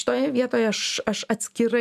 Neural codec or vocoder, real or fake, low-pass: none; real; 14.4 kHz